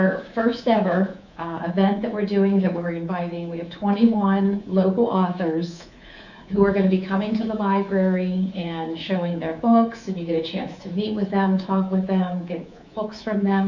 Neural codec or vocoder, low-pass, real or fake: codec, 24 kHz, 3.1 kbps, DualCodec; 7.2 kHz; fake